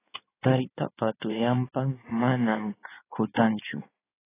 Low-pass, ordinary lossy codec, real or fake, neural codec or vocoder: 3.6 kHz; AAC, 16 kbps; fake; codec, 16 kHz in and 24 kHz out, 2.2 kbps, FireRedTTS-2 codec